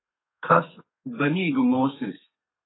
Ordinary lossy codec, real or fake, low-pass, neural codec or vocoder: AAC, 16 kbps; fake; 7.2 kHz; codec, 32 kHz, 1.9 kbps, SNAC